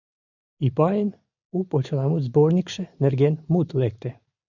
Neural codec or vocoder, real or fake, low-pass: none; real; 7.2 kHz